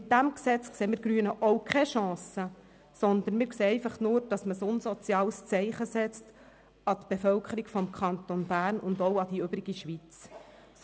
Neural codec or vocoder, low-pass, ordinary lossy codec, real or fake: none; none; none; real